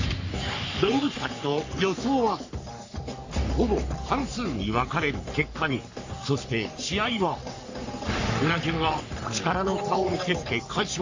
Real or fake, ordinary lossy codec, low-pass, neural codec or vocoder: fake; AAC, 48 kbps; 7.2 kHz; codec, 44.1 kHz, 3.4 kbps, Pupu-Codec